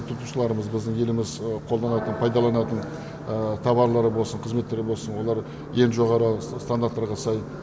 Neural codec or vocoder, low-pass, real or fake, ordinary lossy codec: none; none; real; none